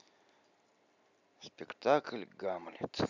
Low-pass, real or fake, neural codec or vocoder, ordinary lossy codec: 7.2 kHz; real; none; none